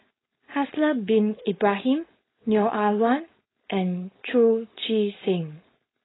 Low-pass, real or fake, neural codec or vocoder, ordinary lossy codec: 7.2 kHz; fake; codec, 16 kHz, 4.8 kbps, FACodec; AAC, 16 kbps